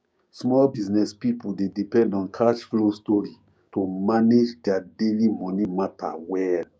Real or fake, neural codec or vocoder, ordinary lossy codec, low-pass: fake; codec, 16 kHz, 6 kbps, DAC; none; none